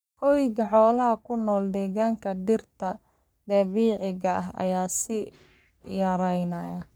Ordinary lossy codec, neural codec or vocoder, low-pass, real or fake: none; codec, 44.1 kHz, 3.4 kbps, Pupu-Codec; none; fake